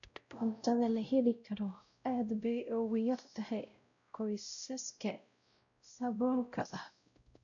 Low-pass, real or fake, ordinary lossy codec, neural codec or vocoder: 7.2 kHz; fake; none; codec, 16 kHz, 0.5 kbps, X-Codec, WavLM features, trained on Multilingual LibriSpeech